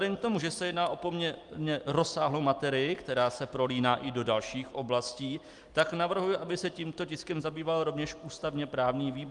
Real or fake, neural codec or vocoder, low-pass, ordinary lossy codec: real; none; 10.8 kHz; Opus, 32 kbps